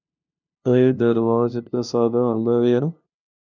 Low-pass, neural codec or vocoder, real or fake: 7.2 kHz; codec, 16 kHz, 0.5 kbps, FunCodec, trained on LibriTTS, 25 frames a second; fake